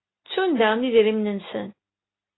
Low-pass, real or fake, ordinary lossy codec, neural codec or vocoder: 7.2 kHz; real; AAC, 16 kbps; none